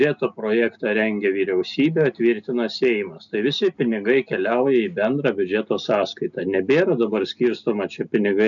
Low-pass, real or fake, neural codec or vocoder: 7.2 kHz; real; none